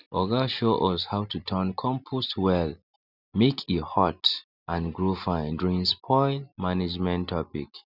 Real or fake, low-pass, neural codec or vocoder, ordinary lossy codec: real; 5.4 kHz; none; none